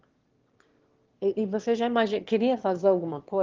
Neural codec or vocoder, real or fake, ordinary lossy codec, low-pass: autoencoder, 22.05 kHz, a latent of 192 numbers a frame, VITS, trained on one speaker; fake; Opus, 16 kbps; 7.2 kHz